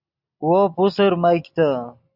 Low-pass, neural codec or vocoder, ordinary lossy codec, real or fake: 5.4 kHz; none; Opus, 64 kbps; real